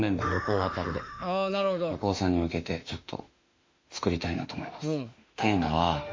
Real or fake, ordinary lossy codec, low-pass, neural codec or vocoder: fake; MP3, 48 kbps; 7.2 kHz; autoencoder, 48 kHz, 32 numbers a frame, DAC-VAE, trained on Japanese speech